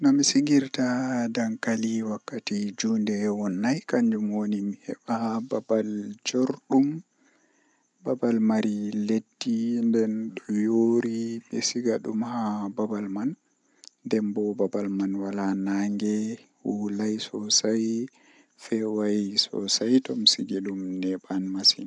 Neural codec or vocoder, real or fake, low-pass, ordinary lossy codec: none; real; 10.8 kHz; none